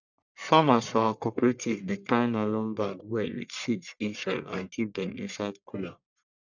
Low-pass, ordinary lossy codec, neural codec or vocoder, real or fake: 7.2 kHz; none; codec, 44.1 kHz, 1.7 kbps, Pupu-Codec; fake